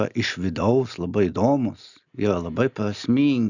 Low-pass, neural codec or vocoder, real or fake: 7.2 kHz; none; real